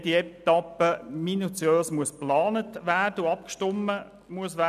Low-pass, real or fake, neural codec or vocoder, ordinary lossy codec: 14.4 kHz; real; none; none